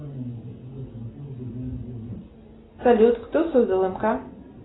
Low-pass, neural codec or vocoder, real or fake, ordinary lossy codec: 7.2 kHz; none; real; AAC, 16 kbps